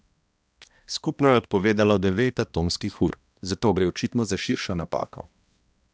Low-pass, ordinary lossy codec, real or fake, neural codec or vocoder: none; none; fake; codec, 16 kHz, 1 kbps, X-Codec, HuBERT features, trained on balanced general audio